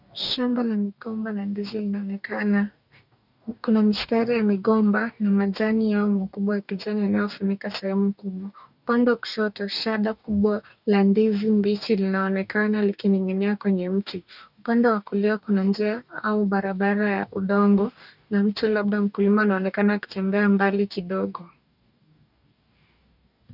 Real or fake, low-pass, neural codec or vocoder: fake; 5.4 kHz; codec, 44.1 kHz, 2.6 kbps, DAC